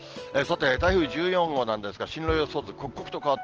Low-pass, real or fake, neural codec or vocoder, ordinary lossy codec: 7.2 kHz; real; none; Opus, 16 kbps